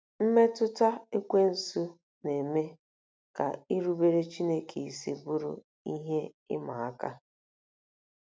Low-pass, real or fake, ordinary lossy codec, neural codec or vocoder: none; real; none; none